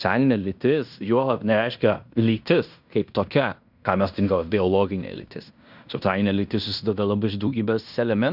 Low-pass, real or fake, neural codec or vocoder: 5.4 kHz; fake; codec, 16 kHz in and 24 kHz out, 0.9 kbps, LongCat-Audio-Codec, fine tuned four codebook decoder